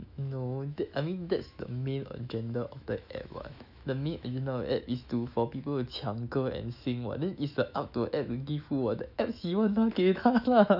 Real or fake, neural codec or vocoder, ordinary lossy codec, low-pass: fake; autoencoder, 48 kHz, 128 numbers a frame, DAC-VAE, trained on Japanese speech; none; 5.4 kHz